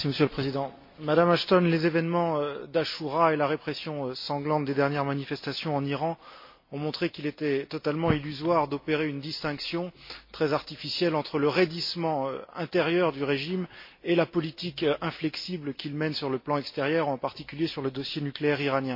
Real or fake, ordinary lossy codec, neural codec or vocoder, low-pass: real; MP3, 32 kbps; none; 5.4 kHz